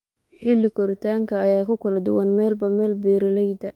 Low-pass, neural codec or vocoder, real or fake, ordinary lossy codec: 19.8 kHz; autoencoder, 48 kHz, 32 numbers a frame, DAC-VAE, trained on Japanese speech; fake; Opus, 32 kbps